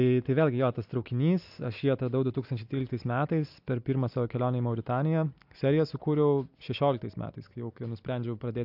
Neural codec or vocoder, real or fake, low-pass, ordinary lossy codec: none; real; 5.4 kHz; AAC, 48 kbps